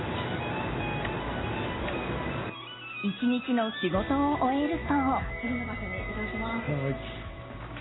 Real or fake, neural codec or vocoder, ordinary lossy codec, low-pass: real; none; AAC, 16 kbps; 7.2 kHz